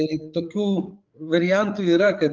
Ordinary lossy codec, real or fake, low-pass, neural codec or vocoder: Opus, 24 kbps; fake; 7.2 kHz; codec, 16 kHz, 8 kbps, FreqCodec, larger model